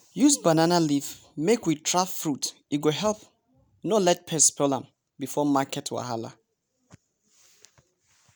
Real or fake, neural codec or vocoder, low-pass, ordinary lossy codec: real; none; none; none